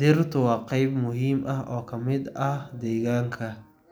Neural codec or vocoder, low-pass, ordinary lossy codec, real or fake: none; none; none; real